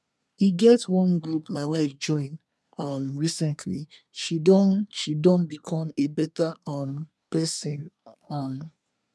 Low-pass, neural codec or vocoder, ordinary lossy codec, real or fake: none; codec, 24 kHz, 1 kbps, SNAC; none; fake